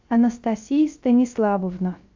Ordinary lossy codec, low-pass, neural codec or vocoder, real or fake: AAC, 48 kbps; 7.2 kHz; codec, 16 kHz, 0.3 kbps, FocalCodec; fake